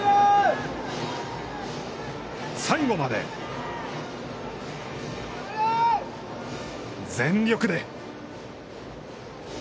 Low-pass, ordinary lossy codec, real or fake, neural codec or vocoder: none; none; real; none